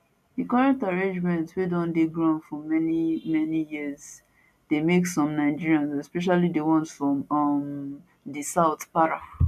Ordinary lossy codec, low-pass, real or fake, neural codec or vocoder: none; 14.4 kHz; real; none